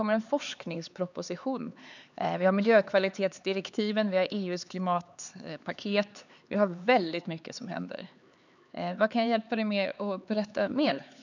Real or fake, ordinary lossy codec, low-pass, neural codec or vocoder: fake; none; 7.2 kHz; codec, 16 kHz, 4 kbps, X-Codec, HuBERT features, trained on LibriSpeech